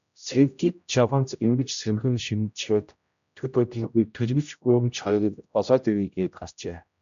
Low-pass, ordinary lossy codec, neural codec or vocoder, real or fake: 7.2 kHz; MP3, 96 kbps; codec, 16 kHz, 0.5 kbps, X-Codec, HuBERT features, trained on general audio; fake